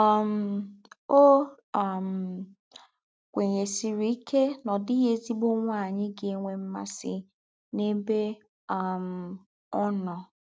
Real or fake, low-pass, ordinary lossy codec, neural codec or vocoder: real; none; none; none